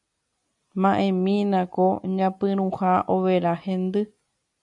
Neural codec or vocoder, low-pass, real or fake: none; 10.8 kHz; real